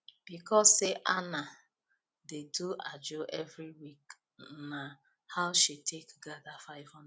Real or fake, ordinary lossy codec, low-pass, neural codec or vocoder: real; none; none; none